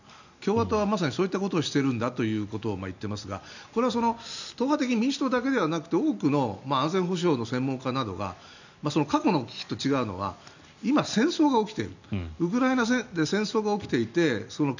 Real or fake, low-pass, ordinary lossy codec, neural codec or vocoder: real; 7.2 kHz; none; none